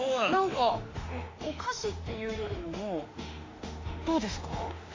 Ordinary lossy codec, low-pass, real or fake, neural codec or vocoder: AAC, 32 kbps; 7.2 kHz; fake; autoencoder, 48 kHz, 32 numbers a frame, DAC-VAE, trained on Japanese speech